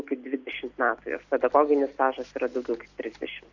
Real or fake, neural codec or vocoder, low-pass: real; none; 7.2 kHz